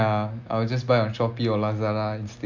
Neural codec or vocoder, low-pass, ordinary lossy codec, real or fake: none; 7.2 kHz; MP3, 64 kbps; real